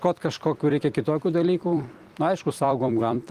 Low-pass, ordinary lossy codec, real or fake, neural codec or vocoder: 14.4 kHz; Opus, 24 kbps; fake; vocoder, 44.1 kHz, 128 mel bands every 256 samples, BigVGAN v2